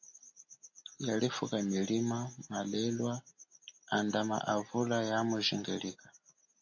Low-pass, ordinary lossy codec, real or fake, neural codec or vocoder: 7.2 kHz; AAC, 48 kbps; real; none